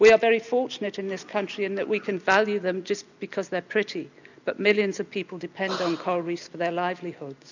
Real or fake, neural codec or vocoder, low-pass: real; none; 7.2 kHz